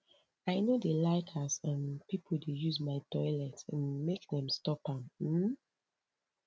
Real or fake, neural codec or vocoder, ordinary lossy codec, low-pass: real; none; none; none